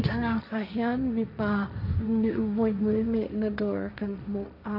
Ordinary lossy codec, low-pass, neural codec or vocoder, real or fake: none; 5.4 kHz; codec, 16 kHz, 1.1 kbps, Voila-Tokenizer; fake